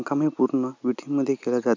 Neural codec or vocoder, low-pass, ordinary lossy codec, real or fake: none; 7.2 kHz; MP3, 64 kbps; real